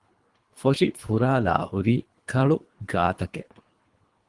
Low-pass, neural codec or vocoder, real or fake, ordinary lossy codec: 10.8 kHz; codec, 24 kHz, 3 kbps, HILCodec; fake; Opus, 24 kbps